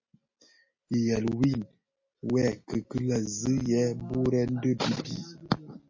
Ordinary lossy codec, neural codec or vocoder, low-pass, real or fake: MP3, 32 kbps; none; 7.2 kHz; real